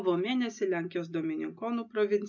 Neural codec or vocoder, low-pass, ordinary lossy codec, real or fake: none; 7.2 kHz; MP3, 64 kbps; real